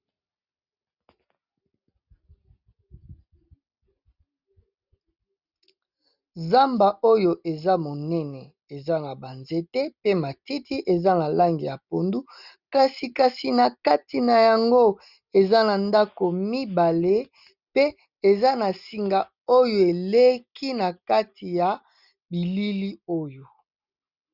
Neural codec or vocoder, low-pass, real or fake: none; 5.4 kHz; real